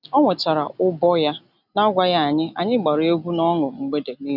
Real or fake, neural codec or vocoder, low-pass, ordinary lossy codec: real; none; 5.4 kHz; none